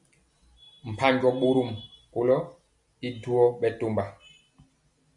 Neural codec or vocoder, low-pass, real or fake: none; 10.8 kHz; real